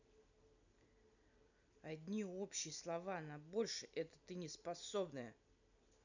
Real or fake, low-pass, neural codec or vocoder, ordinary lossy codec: fake; 7.2 kHz; vocoder, 44.1 kHz, 128 mel bands every 256 samples, BigVGAN v2; none